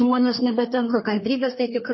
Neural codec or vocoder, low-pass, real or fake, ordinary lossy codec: codec, 24 kHz, 1 kbps, SNAC; 7.2 kHz; fake; MP3, 24 kbps